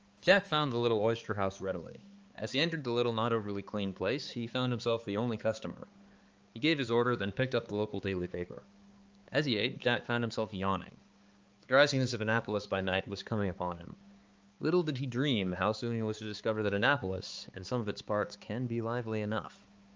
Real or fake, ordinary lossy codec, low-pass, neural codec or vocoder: fake; Opus, 24 kbps; 7.2 kHz; codec, 16 kHz, 4 kbps, X-Codec, HuBERT features, trained on balanced general audio